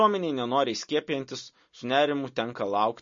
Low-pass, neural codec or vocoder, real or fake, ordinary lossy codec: 7.2 kHz; none; real; MP3, 32 kbps